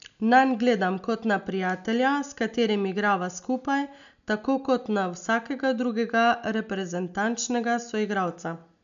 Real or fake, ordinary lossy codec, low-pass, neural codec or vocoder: real; none; 7.2 kHz; none